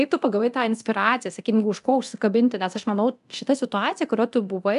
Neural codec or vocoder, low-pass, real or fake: codec, 24 kHz, 0.9 kbps, DualCodec; 10.8 kHz; fake